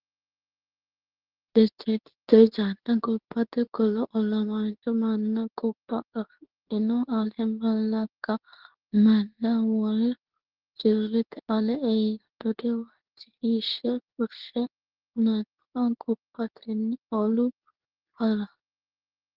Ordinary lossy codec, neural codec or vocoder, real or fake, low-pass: Opus, 16 kbps; codec, 24 kHz, 0.9 kbps, WavTokenizer, medium speech release version 2; fake; 5.4 kHz